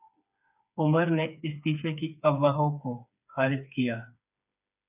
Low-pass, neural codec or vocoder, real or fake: 3.6 kHz; codec, 16 kHz, 4 kbps, FreqCodec, smaller model; fake